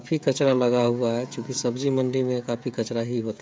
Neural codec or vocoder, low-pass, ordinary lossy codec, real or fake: codec, 16 kHz, 16 kbps, FreqCodec, smaller model; none; none; fake